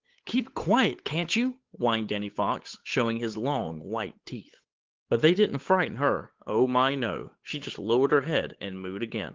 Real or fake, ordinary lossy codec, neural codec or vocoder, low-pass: fake; Opus, 16 kbps; codec, 16 kHz, 8 kbps, FunCodec, trained on Chinese and English, 25 frames a second; 7.2 kHz